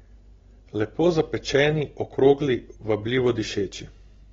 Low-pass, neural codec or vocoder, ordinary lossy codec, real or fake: 7.2 kHz; none; AAC, 24 kbps; real